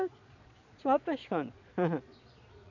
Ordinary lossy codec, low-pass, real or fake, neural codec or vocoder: none; 7.2 kHz; fake; vocoder, 22.05 kHz, 80 mel bands, Vocos